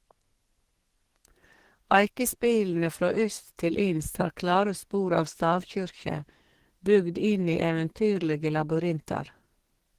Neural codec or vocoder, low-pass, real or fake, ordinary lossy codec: codec, 44.1 kHz, 2.6 kbps, SNAC; 14.4 kHz; fake; Opus, 16 kbps